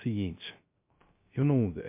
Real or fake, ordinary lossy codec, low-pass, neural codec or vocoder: fake; MP3, 32 kbps; 3.6 kHz; codec, 16 kHz, 0.3 kbps, FocalCodec